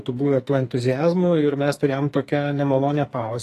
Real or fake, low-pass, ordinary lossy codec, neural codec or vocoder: fake; 14.4 kHz; AAC, 48 kbps; codec, 44.1 kHz, 2.6 kbps, SNAC